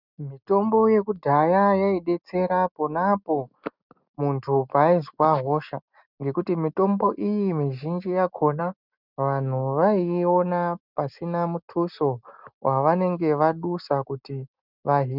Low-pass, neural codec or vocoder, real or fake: 5.4 kHz; none; real